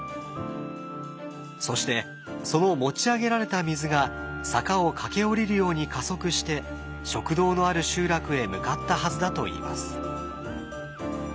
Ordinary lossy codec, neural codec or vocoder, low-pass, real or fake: none; none; none; real